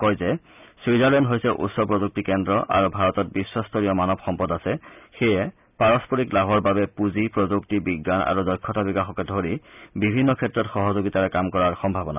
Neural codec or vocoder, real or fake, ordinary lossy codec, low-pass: none; real; none; 3.6 kHz